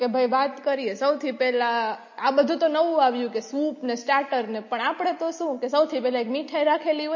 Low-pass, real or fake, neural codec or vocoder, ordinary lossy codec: 7.2 kHz; real; none; MP3, 32 kbps